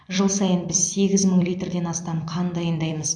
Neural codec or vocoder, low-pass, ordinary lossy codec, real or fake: vocoder, 44.1 kHz, 128 mel bands every 256 samples, BigVGAN v2; 9.9 kHz; MP3, 64 kbps; fake